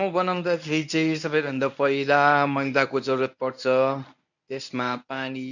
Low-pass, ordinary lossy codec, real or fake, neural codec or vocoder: 7.2 kHz; none; fake; codec, 24 kHz, 0.9 kbps, WavTokenizer, medium speech release version 1